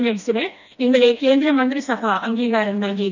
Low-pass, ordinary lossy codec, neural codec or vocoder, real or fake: 7.2 kHz; none; codec, 16 kHz, 1 kbps, FreqCodec, smaller model; fake